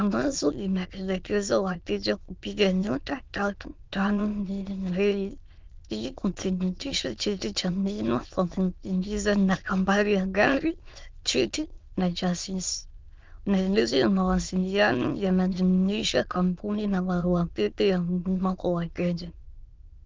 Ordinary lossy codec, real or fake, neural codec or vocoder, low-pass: Opus, 32 kbps; fake; autoencoder, 22.05 kHz, a latent of 192 numbers a frame, VITS, trained on many speakers; 7.2 kHz